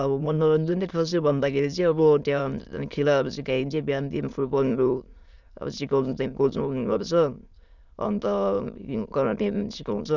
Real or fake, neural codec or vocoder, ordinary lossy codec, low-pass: fake; autoencoder, 22.05 kHz, a latent of 192 numbers a frame, VITS, trained on many speakers; none; 7.2 kHz